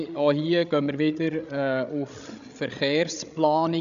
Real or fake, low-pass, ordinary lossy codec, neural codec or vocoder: fake; 7.2 kHz; none; codec, 16 kHz, 16 kbps, FreqCodec, larger model